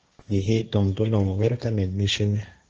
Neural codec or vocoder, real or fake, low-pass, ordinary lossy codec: codec, 16 kHz, 1.1 kbps, Voila-Tokenizer; fake; 7.2 kHz; Opus, 24 kbps